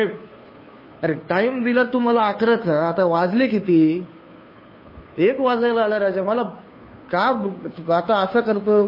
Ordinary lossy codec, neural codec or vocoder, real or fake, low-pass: MP3, 24 kbps; codec, 16 kHz, 2 kbps, FunCodec, trained on Chinese and English, 25 frames a second; fake; 5.4 kHz